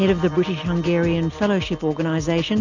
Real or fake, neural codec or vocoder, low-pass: real; none; 7.2 kHz